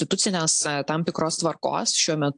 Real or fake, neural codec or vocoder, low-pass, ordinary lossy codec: real; none; 9.9 kHz; AAC, 64 kbps